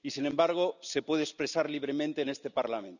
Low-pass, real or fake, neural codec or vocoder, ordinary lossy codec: 7.2 kHz; real; none; none